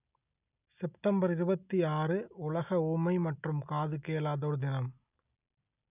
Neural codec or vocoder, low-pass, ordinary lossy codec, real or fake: none; 3.6 kHz; none; real